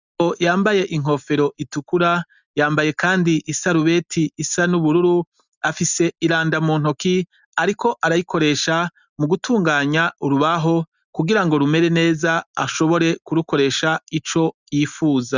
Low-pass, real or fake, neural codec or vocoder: 7.2 kHz; real; none